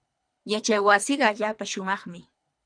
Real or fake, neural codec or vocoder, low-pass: fake; codec, 24 kHz, 3 kbps, HILCodec; 9.9 kHz